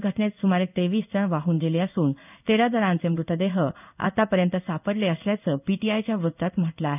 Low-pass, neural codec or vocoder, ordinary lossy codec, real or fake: 3.6 kHz; codec, 16 kHz in and 24 kHz out, 1 kbps, XY-Tokenizer; none; fake